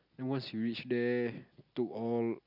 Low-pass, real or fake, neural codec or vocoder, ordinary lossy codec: 5.4 kHz; real; none; none